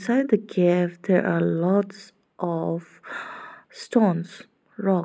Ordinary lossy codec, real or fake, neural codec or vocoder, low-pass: none; real; none; none